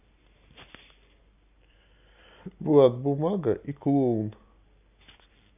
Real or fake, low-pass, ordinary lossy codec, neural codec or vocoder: real; 3.6 kHz; AAC, 32 kbps; none